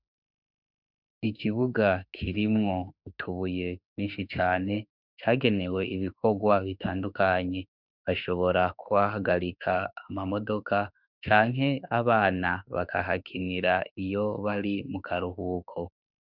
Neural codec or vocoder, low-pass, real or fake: autoencoder, 48 kHz, 32 numbers a frame, DAC-VAE, trained on Japanese speech; 5.4 kHz; fake